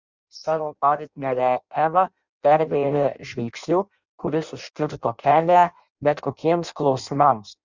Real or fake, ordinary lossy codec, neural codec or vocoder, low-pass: fake; Opus, 64 kbps; codec, 16 kHz in and 24 kHz out, 0.6 kbps, FireRedTTS-2 codec; 7.2 kHz